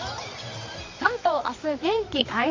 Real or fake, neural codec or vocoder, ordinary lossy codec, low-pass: fake; codec, 24 kHz, 0.9 kbps, WavTokenizer, medium music audio release; MP3, 48 kbps; 7.2 kHz